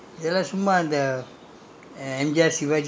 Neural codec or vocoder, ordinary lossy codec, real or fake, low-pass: none; none; real; none